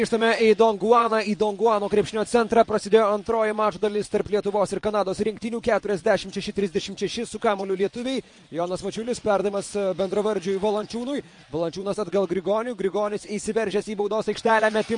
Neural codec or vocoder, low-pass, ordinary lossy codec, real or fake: vocoder, 22.05 kHz, 80 mel bands, Vocos; 9.9 kHz; MP3, 48 kbps; fake